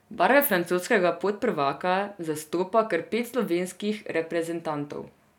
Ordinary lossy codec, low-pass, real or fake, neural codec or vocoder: none; 19.8 kHz; real; none